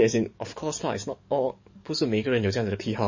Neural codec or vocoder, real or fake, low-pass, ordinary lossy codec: codec, 44.1 kHz, 7.8 kbps, DAC; fake; 7.2 kHz; MP3, 32 kbps